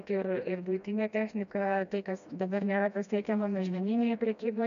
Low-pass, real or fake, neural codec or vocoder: 7.2 kHz; fake; codec, 16 kHz, 1 kbps, FreqCodec, smaller model